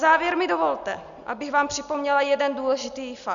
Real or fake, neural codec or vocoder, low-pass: real; none; 7.2 kHz